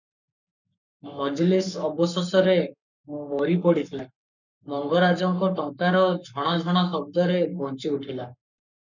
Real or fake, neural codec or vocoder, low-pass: fake; codec, 44.1 kHz, 7.8 kbps, Pupu-Codec; 7.2 kHz